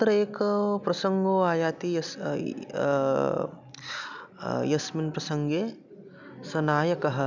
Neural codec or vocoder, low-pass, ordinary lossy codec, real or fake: none; 7.2 kHz; none; real